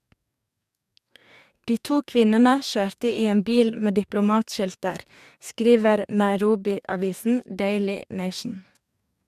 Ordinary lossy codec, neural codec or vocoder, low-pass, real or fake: none; codec, 44.1 kHz, 2.6 kbps, DAC; 14.4 kHz; fake